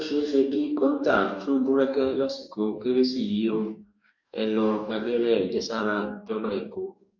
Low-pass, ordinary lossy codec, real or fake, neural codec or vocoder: 7.2 kHz; none; fake; codec, 44.1 kHz, 2.6 kbps, DAC